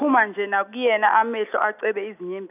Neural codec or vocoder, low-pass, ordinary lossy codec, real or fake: none; 3.6 kHz; none; real